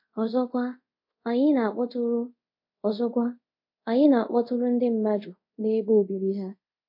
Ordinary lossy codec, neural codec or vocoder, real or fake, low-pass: MP3, 32 kbps; codec, 24 kHz, 0.5 kbps, DualCodec; fake; 5.4 kHz